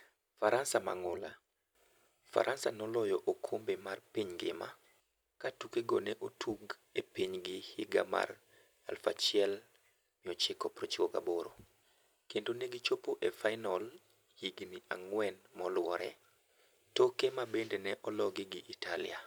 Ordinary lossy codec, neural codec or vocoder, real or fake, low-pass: none; none; real; none